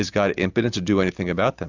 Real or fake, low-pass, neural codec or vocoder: real; 7.2 kHz; none